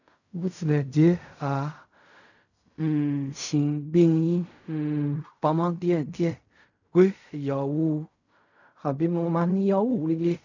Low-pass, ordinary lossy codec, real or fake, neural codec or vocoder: 7.2 kHz; none; fake; codec, 16 kHz in and 24 kHz out, 0.4 kbps, LongCat-Audio-Codec, fine tuned four codebook decoder